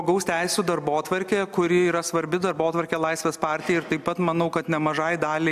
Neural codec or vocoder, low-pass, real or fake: none; 14.4 kHz; real